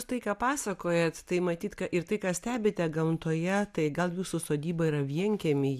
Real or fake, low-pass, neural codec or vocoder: real; 14.4 kHz; none